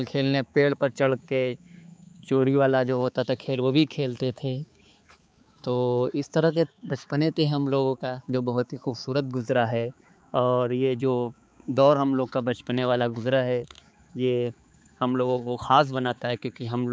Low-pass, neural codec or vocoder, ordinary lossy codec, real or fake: none; codec, 16 kHz, 4 kbps, X-Codec, HuBERT features, trained on balanced general audio; none; fake